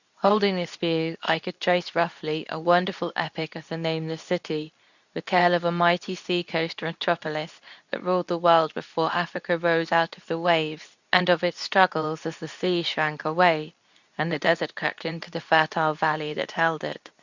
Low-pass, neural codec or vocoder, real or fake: 7.2 kHz; codec, 24 kHz, 0.9 kbps, WavTokenizer, medium speech release version 2; fake